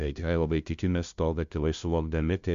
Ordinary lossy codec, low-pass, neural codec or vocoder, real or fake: Opus, 64 kbps; 7.2 kHz; codec, 16 kHz, 0.5 kbps, FunCodec, trained on LibriTTS, 25 frames a second; fake